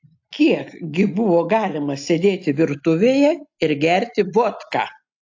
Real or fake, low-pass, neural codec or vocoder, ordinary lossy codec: real; 7.2 kHz; none; AAC, 48 kbps